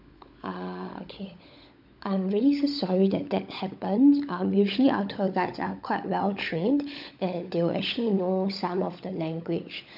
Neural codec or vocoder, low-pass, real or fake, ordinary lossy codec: codec, 16 kHz, 16 kbps, FunCodec, trained on LibriTTS, 50 frames a second; 5.4 kHz; fake; none